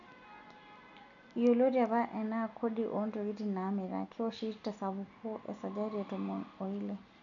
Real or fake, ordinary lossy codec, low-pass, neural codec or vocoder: real; none; 7.2 kHz; none